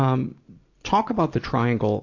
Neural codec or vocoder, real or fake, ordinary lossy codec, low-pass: none; real; AAC, 48 kbps; 7.2 kHz